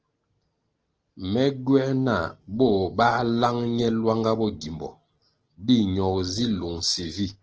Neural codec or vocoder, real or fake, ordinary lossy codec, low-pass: none; real; Opus, 16 kbps; 7.2 kHz